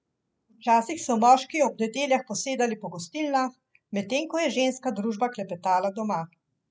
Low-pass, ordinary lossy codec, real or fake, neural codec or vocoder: none; none; real; none